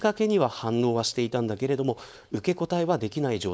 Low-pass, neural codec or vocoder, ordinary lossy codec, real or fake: none; codec, 16 kHz, 4.8 kbps, FACodec; none; fake